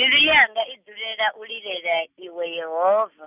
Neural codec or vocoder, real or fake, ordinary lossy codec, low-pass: none; real; none; 3.6 kHz